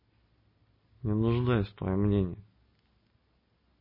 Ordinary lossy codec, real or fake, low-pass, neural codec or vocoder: MP3, 24 kbps; real; 5.4 kHz; none